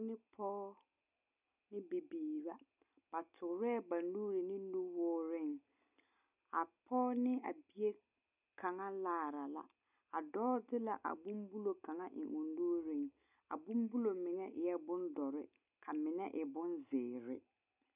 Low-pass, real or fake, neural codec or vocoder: 3.6 kHz; real; none